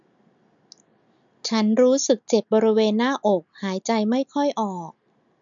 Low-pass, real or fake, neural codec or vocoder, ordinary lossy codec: 7.2 kHz; real; none; none